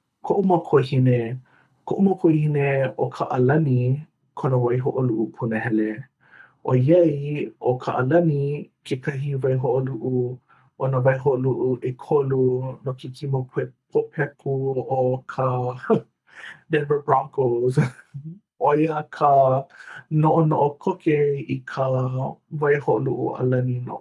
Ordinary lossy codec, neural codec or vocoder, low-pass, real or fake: none; codec, 24 kHz, 6 kbps, HILCodec; none; fake